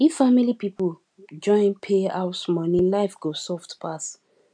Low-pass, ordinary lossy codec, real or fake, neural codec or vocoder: 9.9 kHz; none; real; none